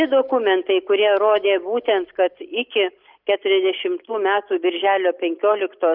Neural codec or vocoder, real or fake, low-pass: none; real; 5.4 kHz